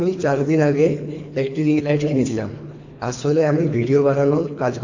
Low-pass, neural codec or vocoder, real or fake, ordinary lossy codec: 7.2 kHz; codec, 24 kHz, 3 kbps, HILCodec; fake; AAC, 48 kbps